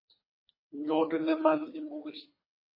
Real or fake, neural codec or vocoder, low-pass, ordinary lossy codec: fake; codec, 24 kHz, 3 kbps, HILCodec; 5.4 kHz; MP3, 24 kbps